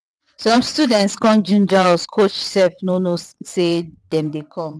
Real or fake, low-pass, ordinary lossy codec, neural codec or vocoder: fake; none; none; vocoder, 22.05 kHz, 80 mel bands, WaveNeXt